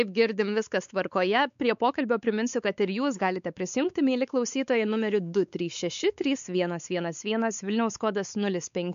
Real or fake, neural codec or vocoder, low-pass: fake; codec, 16 kHz, 4 kbps, X-Codec, WavLM features, trained on Multilingual LibriSpeech; 7.2 kHz